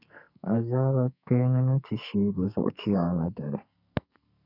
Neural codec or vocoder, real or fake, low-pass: codec, 44.1 kHz, 2.6 kbps, SNAC; fake; 5.4 kHz